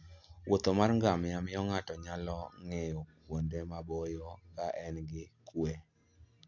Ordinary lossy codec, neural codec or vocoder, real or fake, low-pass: none; none; real; 7.2 kHz